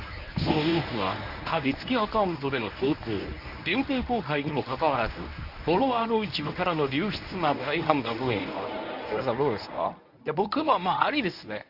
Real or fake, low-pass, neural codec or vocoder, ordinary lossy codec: fake; 5.4 kHz; codec, 24 kHz, 0.9 kbps, WavTokenizer, medium speech release version 1; none